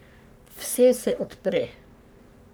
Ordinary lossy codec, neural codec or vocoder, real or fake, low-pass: none; codec, 44.1 kHz, 3.4 kbps, Pupu-Codec; fake; none